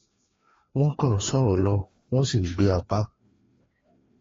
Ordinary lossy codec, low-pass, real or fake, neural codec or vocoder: AAC, 32 kbps; 7.2 kHz; fake; codec, 16 kHz, 2 kbps, FreqCodec, larger model